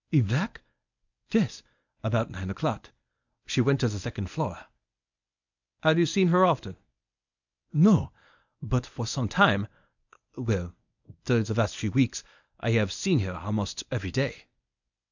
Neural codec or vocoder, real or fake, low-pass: codec, 24 kHz, 0.9 kbps, WavTokenizer, medium speech release version 1; fake; 7.2 kHz